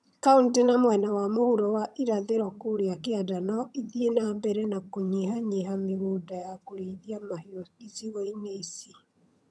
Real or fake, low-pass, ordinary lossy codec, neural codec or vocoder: fake; none; none; vocoder, 22.05 kHz, 80 mel bands, HiFi-GAN